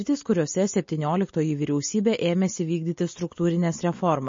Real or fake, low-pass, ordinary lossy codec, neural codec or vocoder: real; 7.2 kHz; MP3, 32 kbps; none